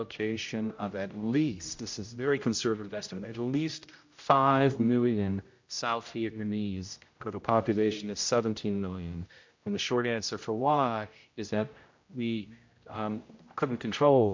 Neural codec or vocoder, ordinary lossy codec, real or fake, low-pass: codec, 16 kHz, 0.5 kbps, X-Codec, HuBERT features, trained on general audio; MP3, 48 kbps; fake; 7.2 kHz